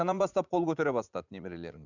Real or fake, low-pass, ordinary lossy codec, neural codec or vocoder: fake; 7.2 kHz; none; vocoder, 44.1 kHz, 80 mel bands, Vocos